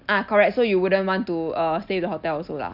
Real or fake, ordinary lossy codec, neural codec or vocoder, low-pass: real; none; none; 5.4 kHz